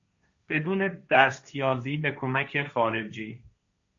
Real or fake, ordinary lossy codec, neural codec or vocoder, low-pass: fake; MP3, 48 kbps; codec, 16 kHz, 1.1 kbps, Voila-Tokenizer; 7.2 kHz